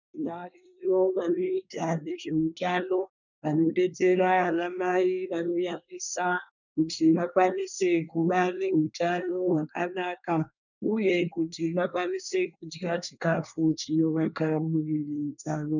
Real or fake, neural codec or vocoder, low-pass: fake; codec, 24 kHz, 1 kbps, SNAC; 7.2 kHz